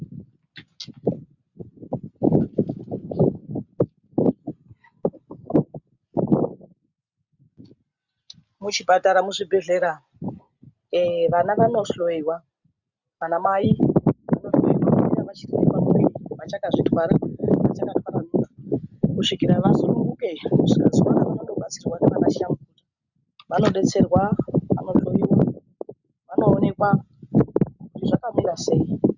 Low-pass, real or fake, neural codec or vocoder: 7.2 kHz; real; none